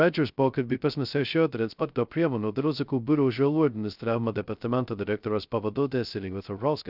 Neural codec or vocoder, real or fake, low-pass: codec, 16 kHz, 0.2 kbps, FocalCodec; fake; 5.4 kHz